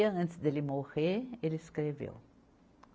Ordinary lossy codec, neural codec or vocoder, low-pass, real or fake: none; none; none; real